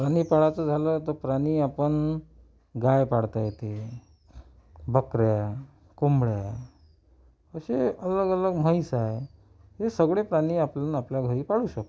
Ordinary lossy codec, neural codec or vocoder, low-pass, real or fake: none; none; none; real